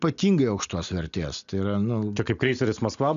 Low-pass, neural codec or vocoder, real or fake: 7.2 kHz; none; real